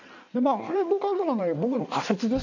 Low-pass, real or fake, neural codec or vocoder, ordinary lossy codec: 7.2 kHz; fake; codec, 16 kHz in and 24 kHz out, 1.1 kbps, FireRedTTS-2 codec; none